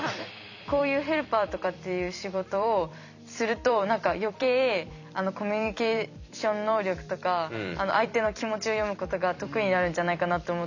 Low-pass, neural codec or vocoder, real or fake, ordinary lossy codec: 7.2 kHz; vocoder, 44.1 kHz, 128 mel bands every 256 samples, BigVGAN v2; fake; none